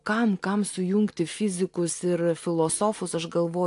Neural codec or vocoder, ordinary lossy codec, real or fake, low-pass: none; AAC, 64 kbps; real; 10.8 kHz